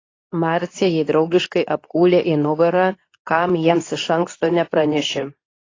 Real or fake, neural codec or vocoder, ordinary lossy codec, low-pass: fake; codec, 24 kHz, 0.9 kbps, WavTokenizer, medium speech release version 2; AAC, 32 kbps; 7.2 kHz